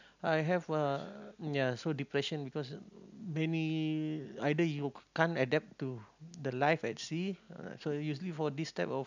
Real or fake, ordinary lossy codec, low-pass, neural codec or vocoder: real; none; 7.2 kHz; none